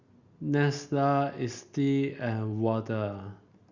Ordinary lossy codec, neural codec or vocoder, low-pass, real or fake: Opus, 64 kbps; none; 7.2 kHz; real